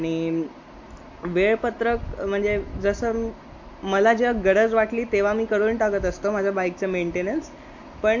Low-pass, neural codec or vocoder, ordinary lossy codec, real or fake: 7.2 kHz; none; MP3, 48 kbps; real